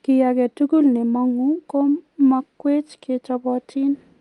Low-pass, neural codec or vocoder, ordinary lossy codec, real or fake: 10.8 kHz; none; Opus, 32 kbps; real